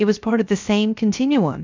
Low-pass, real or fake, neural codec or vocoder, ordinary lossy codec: 7.2 kHz; fake; codec, 16 kHz, 0.3 kbps, FocalCodec; MP3, 64 kbps